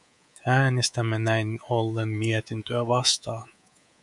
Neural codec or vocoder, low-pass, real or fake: codec, 24 kHz, 3.1 kbps, DualCodec; 10.8 kHz; fake